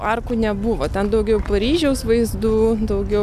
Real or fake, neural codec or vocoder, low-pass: real; none; 14.4 kHz